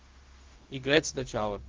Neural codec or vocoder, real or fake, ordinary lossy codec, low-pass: codec, 16 kHz, 0.4 kbps, LongCat-Audio-Codec; fake; Opus, 16 kbps; 7.2 kHz